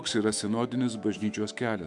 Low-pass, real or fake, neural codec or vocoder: 10.8 kHz; fake; autoencoder, 48 kHz, 128 numbers a frame, DAC-VAE, trained on Japanese speech